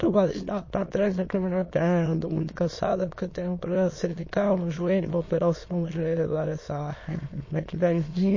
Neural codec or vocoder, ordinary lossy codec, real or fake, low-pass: autoencoder, 22.05 kHz, a latent of 192 numbers a frame, VITS, trained on many speakers; MP3, 32 kbps; fake; 7.2 kHz